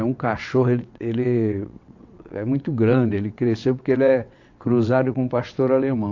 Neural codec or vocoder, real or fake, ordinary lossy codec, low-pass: vocoder, 22.05 kHz, 80 mel bands, WaveNeXt; fake; AAC, 48 kbps; 7.2 kHz